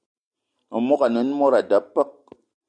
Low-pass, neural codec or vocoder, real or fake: 9.9 kHz; none; real